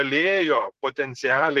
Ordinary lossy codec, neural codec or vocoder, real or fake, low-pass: Opus, 16 kbps; vocoder, 44.1 kHz, 128 mel bands, Pupu-Vocoder; fake; 14.4 kHz